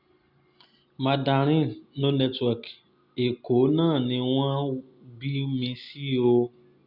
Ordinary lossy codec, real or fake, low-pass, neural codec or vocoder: Opus, 64 kbps; real; 5.4 kHz; none